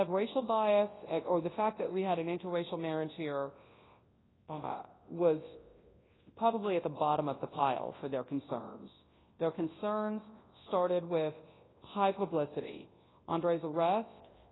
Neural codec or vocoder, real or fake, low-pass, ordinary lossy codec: codec, 24 kHz, 0.9 kbps, WavTokenizer, large speech release; fake; 7.2 kHz; AAC, 16 kbps